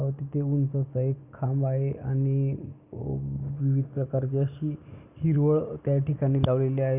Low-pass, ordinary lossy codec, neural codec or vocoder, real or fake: 3.6 kHz; none; none; real